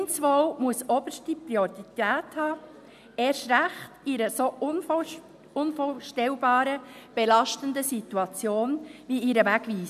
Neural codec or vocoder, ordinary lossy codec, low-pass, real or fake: none; none; 14.4 kHz; real